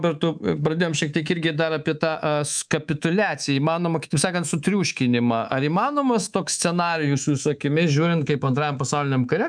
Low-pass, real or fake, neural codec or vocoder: 9.9 kHz; fake; codec, 24 kHz, 3.1 kbps, DualCodec